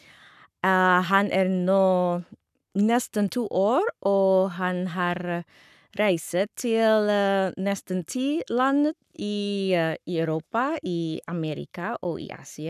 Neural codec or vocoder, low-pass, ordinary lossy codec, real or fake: codec, 44.1 kHz, 7.8 kbps, Pupu-Codec; 14.4 kHz; none; fake